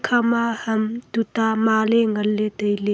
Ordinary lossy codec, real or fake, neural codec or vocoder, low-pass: none; real; none; none